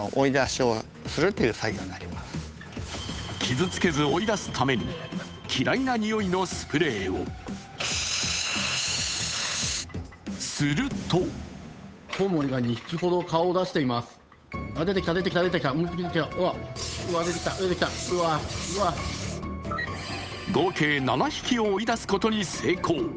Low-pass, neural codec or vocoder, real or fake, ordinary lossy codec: none; codec, 16 kHz, 8 kbps, FunCodec, trained on Chinese and English, 25 frames a second; fake; none